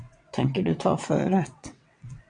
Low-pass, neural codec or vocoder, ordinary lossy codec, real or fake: 9.9 kHz; vocoder, 22.05 kHz, 80 mel bands, WaveNeXt; MP3, 64 kbps; fake